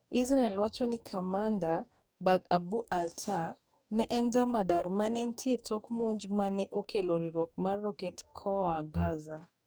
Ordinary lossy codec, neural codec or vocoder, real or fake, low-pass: none; codec, 44.1 kHz, 2.6 kbps, DAC; fake; none